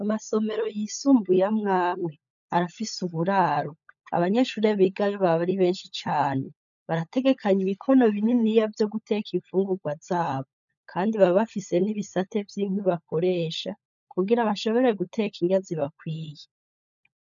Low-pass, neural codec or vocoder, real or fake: 7.2 kHz; codec, 16 kHz, 16 kbps, FunCodec, trained on LibriTTS, 50 frames a second; fake